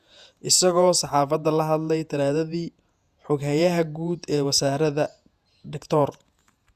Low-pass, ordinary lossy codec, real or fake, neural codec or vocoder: 14.4 kHz; Opus, 64 kbps; fake; vocoder, 48 kHz, 128 mel bands, Vocos